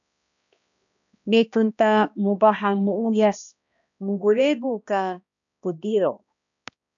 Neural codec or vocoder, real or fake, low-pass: codec, 16 kHz, 1 kbps, X-Codec, HuBERT features, trained on balanced general audio; fake; 7.2 kHz